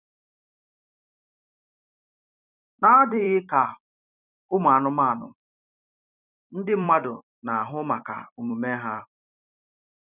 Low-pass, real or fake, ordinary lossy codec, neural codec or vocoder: 3.6 kHz; fake; none; vocoder, 44.1 kHz, 128 mel bands every 256 samples, BigVGAN v2